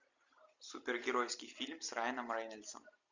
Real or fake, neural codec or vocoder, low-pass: real; none; 7.2 kHz